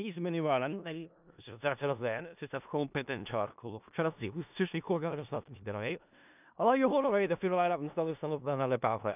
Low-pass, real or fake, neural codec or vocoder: 3.6 kHz; fake; codec, 16 kHz in and 24 kHz out, 0.4 kbps, LongCat-Audio-Codec, four codebook decoder